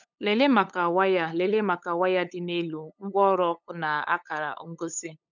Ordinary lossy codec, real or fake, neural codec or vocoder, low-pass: none; fake; codec, 16 kHz, 8 kbps, FunCodec, trained on LibriTTS, 25 frames a second; 7.2 kHz